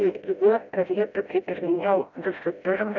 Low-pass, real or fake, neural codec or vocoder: 7.2 kHz; fake; codec, 16 kHz, 0.5 kbps, FreqCodec, smaller model